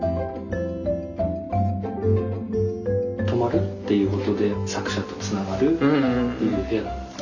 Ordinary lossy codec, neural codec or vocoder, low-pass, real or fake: none; none; 7.2 kHz; real